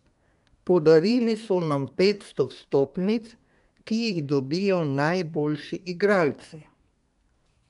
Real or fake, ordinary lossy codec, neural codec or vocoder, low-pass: fake; none; codec, 24 kHz, 1 kbps, SNAC; 10.8 kHz